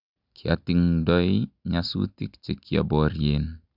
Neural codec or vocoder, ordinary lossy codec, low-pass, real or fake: none; none; 5.4 kHz; real